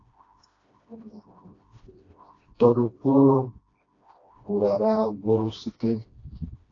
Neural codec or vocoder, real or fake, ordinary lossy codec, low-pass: codec, 16 kHz, 1 kbps, FreqCodec, smaller model; fake; AAC, 32 kbps; 7.2 kHz